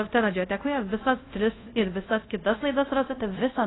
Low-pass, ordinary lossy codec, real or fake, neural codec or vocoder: 7.2 kHz; AAC, 16 kbps; fake; codec, 24 kHz, 0.5 kbps, DualCodec